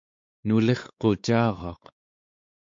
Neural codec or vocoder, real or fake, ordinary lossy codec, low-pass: codec, 16 kHz, 4 kbps, X-Codec, WavLM features, trained on Multilingual LibriSpeech; fake; MP3, 48 kbps; 7.2 kHz